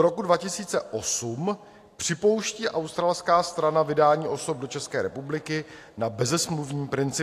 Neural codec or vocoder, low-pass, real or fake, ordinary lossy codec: none; 14.4 kHz; real; AAC, 64 kbps